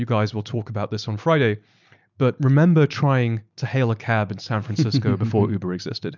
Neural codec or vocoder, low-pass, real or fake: none; 7.2 kHz; real